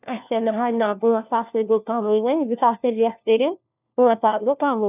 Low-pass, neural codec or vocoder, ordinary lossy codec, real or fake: 3.6 kHz; codec, 16 kHz, 1 kbps, FunCodec, trained on LibriTTS, 50 frames a second; none; fake